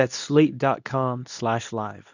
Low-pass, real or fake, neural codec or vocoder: 7.2 kHz; fake; codec, 24 kHz, 0.9 kbps, WavTokenizer, medium speech release version 2